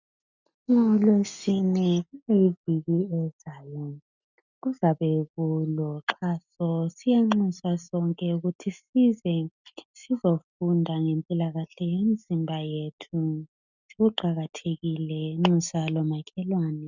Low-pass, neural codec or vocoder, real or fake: 7.2 kHz; none; real